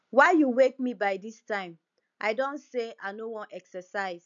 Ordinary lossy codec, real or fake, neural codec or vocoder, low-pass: none; real; none; 7.2 kHz